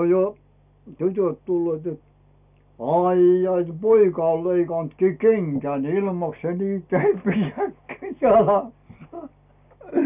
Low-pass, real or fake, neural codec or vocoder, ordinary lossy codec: 3.6 kHz; real; none; none